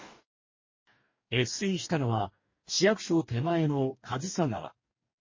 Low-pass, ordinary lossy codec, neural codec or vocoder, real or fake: 7.2 kHz; MP3, 32 kbps; codec, 44.1 kHz, 2.6 kbps, DAC; fake